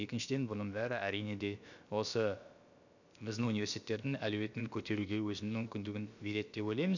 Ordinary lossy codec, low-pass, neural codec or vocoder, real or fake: none; 7.2 kHz; codec, 16 kHz, about 1 kbps, DyCAST, with the encoder's durations; fake